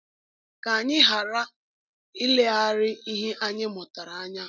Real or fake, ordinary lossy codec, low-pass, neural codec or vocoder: real; none; 7.2 kHz; none